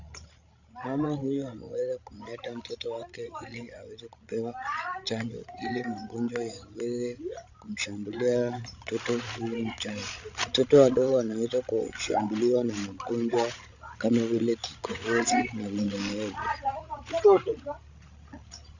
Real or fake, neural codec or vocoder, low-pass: fake; codec, 16 kHz, 16 kbps, FreqCodec, larger model; 7.2 kHz